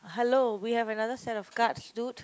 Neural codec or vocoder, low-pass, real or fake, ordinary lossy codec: none; none; real; none